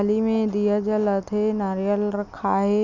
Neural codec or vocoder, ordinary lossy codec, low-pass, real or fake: none; none; 7.2 kHz; real